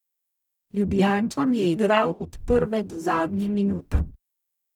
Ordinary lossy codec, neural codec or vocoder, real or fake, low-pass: none; codec, 44.1 kHz, 0.9 kbps, DAC; fake; 19.8 kHz